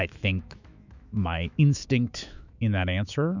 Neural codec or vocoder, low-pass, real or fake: autoencoder, 48 kHz, 128 numbers a frame, DAC-VAE, trained on Japanese speech; 7.2 kHz; fake